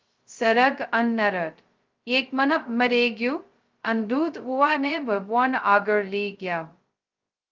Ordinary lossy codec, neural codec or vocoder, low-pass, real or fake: Opus, 32 kbps; codec, 16 kHz, 0.2 kbps, FocalCodec; 7.2 kHz; fake